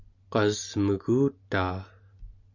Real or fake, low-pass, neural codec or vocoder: real; 7.2 kHz; none